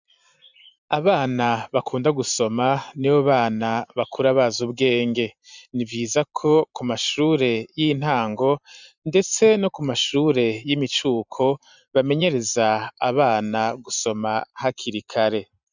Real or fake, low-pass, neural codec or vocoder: fake; 7.2 kHz; vocoder, 44.1 kHz, 128 mel bands every 512 samples, BigVGAN v2